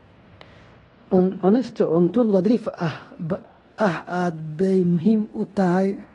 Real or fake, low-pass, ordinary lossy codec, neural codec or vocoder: fake; 10.8 kHz; AAC, 32 kbps; codec, 16 kHz in and 24 kHz out, 0.9 kbps, LongCat-Audio-Codec, fine tuned four codebook decoder